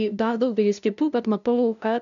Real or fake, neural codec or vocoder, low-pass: fake; codec, 16 kHz, 0.5 kbps, FunCodec, trained on LibriTTS, 25 frames a second; 7.2 kHz